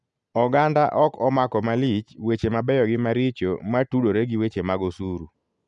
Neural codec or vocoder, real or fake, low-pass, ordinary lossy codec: none; real; 10.8 kHz; none